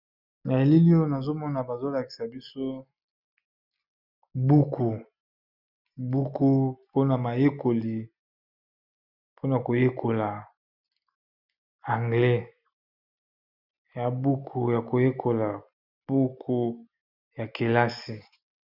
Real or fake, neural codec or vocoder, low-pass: real; none; 5.4 kHz